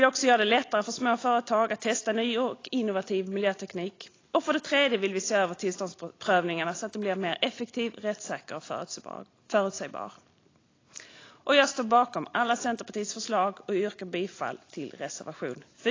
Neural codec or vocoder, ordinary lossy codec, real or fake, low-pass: none; AAC, 32 kbps; real; 7.2 kHz